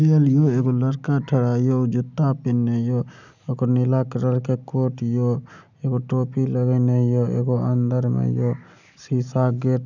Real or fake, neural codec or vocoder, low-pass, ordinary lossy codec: real; none; 7.2 kHz; none